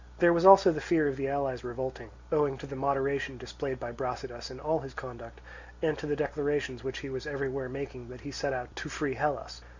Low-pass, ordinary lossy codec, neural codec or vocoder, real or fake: 7.2 kHz; Opus, 64 kbps; none; real